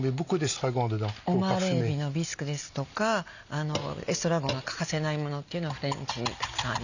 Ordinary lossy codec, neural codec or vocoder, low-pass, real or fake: none; none; 7.2 kHz; real